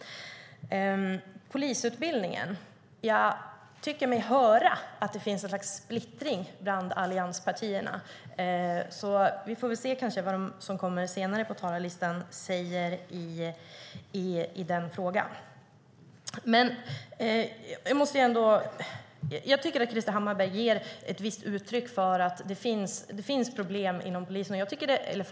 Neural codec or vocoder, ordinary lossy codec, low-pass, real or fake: none; none; none; real